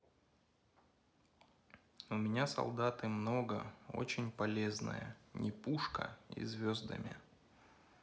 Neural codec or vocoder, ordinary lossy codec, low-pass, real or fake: none; none; none; real